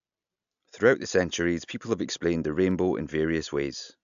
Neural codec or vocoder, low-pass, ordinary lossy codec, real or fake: none; 7.2 kHz; AAC, 96 kbps; real